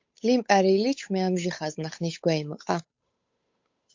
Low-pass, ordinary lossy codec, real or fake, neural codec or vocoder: 7.2 kHz; MP3, 48 kbps; fake; codec, 16 kHz, 8 kbps, FunCodec, trained on Chinese and English, 25 frames a second